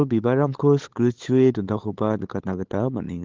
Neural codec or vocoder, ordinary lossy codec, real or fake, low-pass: codec, 16 kHz, 8 kbps, FunCodec, trained on LibriTTS, 25 frames a second; Opus, 16 kbps; fake; 7.2 kHz